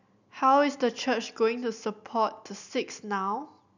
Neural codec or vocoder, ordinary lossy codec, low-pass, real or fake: none; none; 7.2 kHz; real